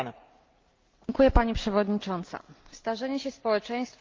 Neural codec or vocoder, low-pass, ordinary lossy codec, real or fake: none; 7.2 kHz; Opus, 32 kbps; real